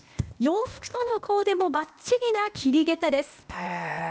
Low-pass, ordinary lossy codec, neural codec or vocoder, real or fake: none; none; codec, 16 kHz, 0.8 kbps, ZipCodec; fake